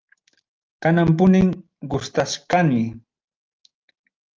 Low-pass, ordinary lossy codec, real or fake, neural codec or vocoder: 7.2 kHz; Opus, 24 kbps; real; none